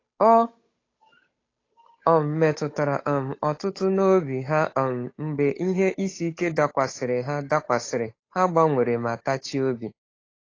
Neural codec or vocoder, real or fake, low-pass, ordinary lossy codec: codec, 16 kHz, 8 kbps, FunCodec, trained on Chinese and English, 25 frames a second; fake; 7.2 kHz; AAC, 32 kbps